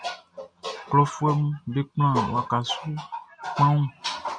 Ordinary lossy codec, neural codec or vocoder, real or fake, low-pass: MP3, 96 kbps; none; real; 9.9 kHz